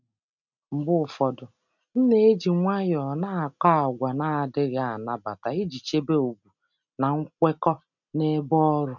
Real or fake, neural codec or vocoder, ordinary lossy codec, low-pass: real; none; none; 7.2 kHz